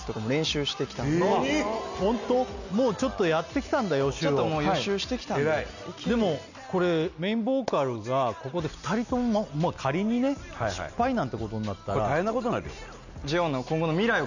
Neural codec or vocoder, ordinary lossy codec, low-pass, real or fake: none; none; 7.2 kHz; real